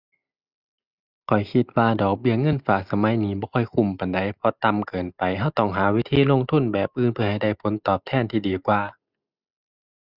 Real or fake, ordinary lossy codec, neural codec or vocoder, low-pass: real; Opus, 64 kbps; none; 5.4 kHz